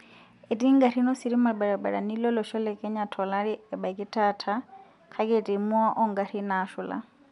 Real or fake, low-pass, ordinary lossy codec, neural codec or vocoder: real; 10.8 kHz; none; none